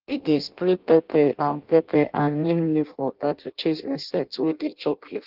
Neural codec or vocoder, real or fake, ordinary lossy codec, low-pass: codec, 16 kHz in and 24 kHz out, 0.6 kbps, FireRedTTS-2 codec; fake; Opus, 32 kbps; 5.4 kHz